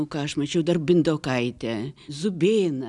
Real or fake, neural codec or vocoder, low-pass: real; none; 10.8 kHz